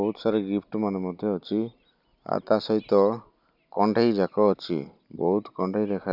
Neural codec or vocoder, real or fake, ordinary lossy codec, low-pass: none; real; none; 5.4 kHz